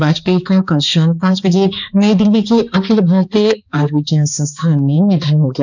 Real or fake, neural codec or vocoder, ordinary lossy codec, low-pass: fake; codec, 16 kHz, 2 kbps, X-Codec, HuBERT features, trained on balanced general audio; none; 7.2 kHz